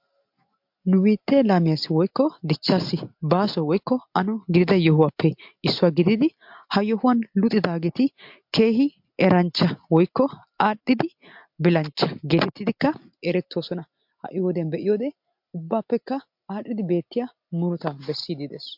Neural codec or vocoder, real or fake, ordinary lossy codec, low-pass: none; real; MP3, 48 kbps; 5.4 kHz